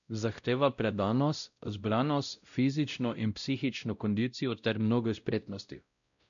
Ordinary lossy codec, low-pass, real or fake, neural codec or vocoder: none; 7.2 kHz; fake; codec, 16 kHz, 0.5 kbps, X-Codec, WavLM features, trained on Multilingual LibriSpeech